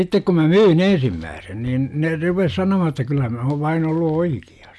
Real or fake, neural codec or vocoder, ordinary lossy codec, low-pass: real; none; none; none